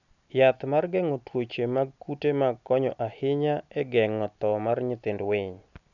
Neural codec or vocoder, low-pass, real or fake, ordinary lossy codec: none; 7.2 kHz; real; none